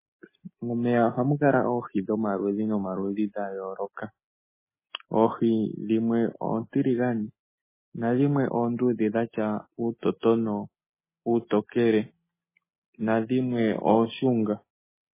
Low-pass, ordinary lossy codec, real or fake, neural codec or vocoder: 3.6 kHz; MP3, 16 kbps; real; none